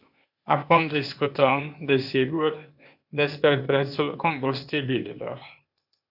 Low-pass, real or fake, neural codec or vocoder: 5.4 kHz; fake; codec, 16 kHz, 0.8 kbps, ZipCodec